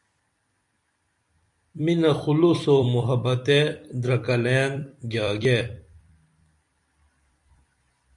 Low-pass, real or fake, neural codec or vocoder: 10.8 kHz; fake; vocoder, 44.1 kHz, 128 mel bands every 256 samples, BigVGAN v2